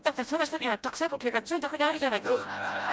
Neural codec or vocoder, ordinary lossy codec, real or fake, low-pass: codec, 16 kHz, 0.5 kbps, FreqCodec, smaller model; none; fake; none